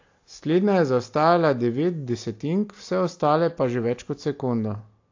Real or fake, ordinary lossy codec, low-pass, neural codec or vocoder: real; AAC, 48 kbps; 7.2 kHz; none